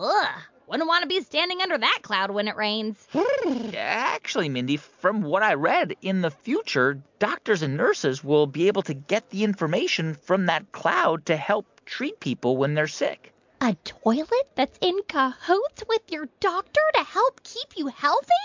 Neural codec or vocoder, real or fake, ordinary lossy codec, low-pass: none; real; MP3, 64 kbps; 7.2 kHz